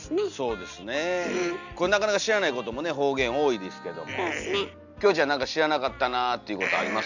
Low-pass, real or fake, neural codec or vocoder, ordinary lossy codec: 7.2 kHz; real; none; none